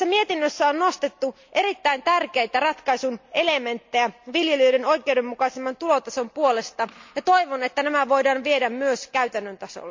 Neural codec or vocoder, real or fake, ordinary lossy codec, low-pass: none; real; MP3, 64 kbps; 7.2 kHz